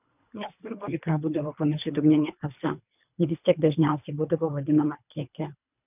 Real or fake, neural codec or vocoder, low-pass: fake; codec, 24 kHz, 3 kbps, HILCodec; 3.6 kHz